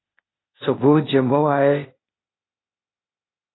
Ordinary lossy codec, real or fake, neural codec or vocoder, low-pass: AAC, 16 kbps; fake; codec, 16 kHz, 0.8 kbps, ZipCodec; 7.2 kHz